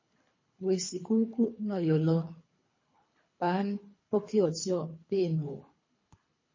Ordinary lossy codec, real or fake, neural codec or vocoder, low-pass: MP3, 32 kbps; fake; codec, 24 kHz, 3 kbps, HILCodec; 7.2 kHz